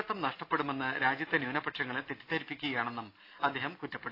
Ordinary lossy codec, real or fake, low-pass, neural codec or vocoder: AAC, 32 kbps; real; 5.4 kHz; none